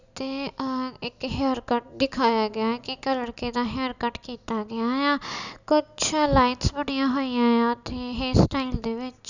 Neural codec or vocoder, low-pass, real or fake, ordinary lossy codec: none; 7.2 kHz; real; none